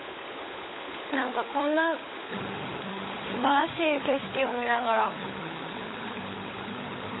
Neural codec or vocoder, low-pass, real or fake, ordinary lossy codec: codec, 16 kHz, 16 kbps, FunCodec, trained on LibriTTS, 50 frames a second; 7.2 kHz; fake; AAC, 16 kbps